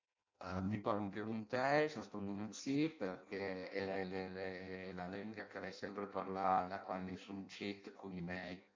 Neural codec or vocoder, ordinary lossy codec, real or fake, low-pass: codec, 16 kHz in and 24 kHz out, 0.6 kbps, FireRedTTS-2 codec; MP3, 48 kbps; fake; 7.2 kHz